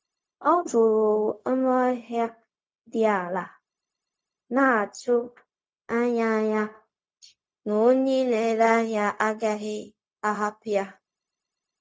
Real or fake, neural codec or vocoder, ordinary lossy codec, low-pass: fake; codec, 16 kHz, 0.4 kbps, LongCat-Audio-Codec; none; none